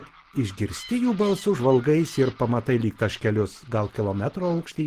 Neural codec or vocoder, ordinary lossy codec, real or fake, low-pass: none; Opus, 16 kbps; real; 14.4 kHz